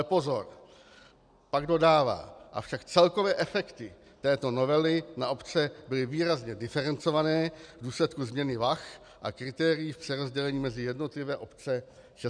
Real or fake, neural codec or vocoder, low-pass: real; none; 9.9 kHz